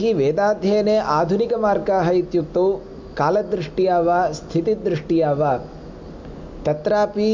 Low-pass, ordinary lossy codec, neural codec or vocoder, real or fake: 7.2 kHz; MP3, 64 kbps; none; real